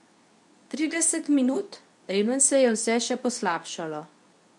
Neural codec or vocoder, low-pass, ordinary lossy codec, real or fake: codec, 24 kHz, 0.9 kbps, WavTokenizer, medium speech release version 2; 10.8 kHz; none; fake